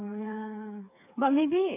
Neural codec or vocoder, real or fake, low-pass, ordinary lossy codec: codec, 16 kHz, 4 kbps, FreqCodec, smaller model; fake; 3.6 kHz; AAC, 24 kbps